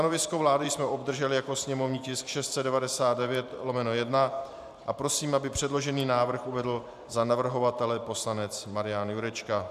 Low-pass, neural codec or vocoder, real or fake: 14.4 kHz; vocoder, 44.1 kHz, 128 mel bands every 512 samples, BigVGAN v2; fake